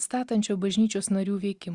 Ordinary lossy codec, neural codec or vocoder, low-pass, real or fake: Opus, 64 kbps; none; 10.8 kHz; real